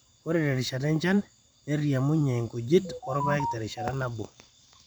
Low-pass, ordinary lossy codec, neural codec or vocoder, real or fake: none; none; vocoder, 44.1 kHz, 128 mel bands every 512 samples, BigVGAN v2; fake